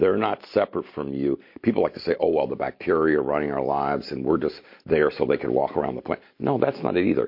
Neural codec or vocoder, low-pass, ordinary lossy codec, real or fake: none; 5.4 kHz; MP3, 32 kbps; real